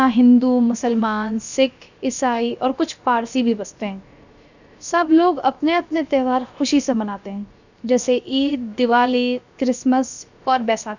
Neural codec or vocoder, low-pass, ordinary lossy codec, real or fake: codec, 16 kHz, about 1 kbps, DyCAST, with the encoder's durations; 7.2 kHz; none; fake